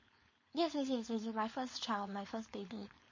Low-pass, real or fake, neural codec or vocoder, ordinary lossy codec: 7.2 kHz; fake; codec, 16 kHz, 4.8 kbps, FACodec; MP3, 32 kbps